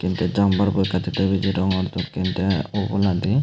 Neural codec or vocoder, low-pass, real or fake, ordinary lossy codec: none; none; real; none